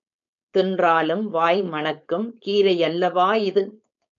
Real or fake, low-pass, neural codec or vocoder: fake; 7.2 kHz; codec, 16 kHz, 4.8 kbps, FACodec